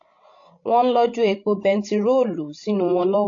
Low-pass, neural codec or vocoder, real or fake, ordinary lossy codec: 7.2 kHz; codec, 16 kHz, 16 kbps, FreqCodec, larger model; fake; AAC, 48 kbps